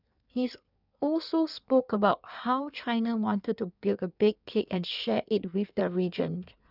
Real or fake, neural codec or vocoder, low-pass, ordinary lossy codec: fake; codec, 16 kHz in and 24 kHz out, 1.1 kbps, FireRedTTS-2 codec; 5.4 kHz; none